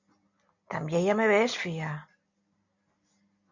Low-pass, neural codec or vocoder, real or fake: 7.2 kHz; none; real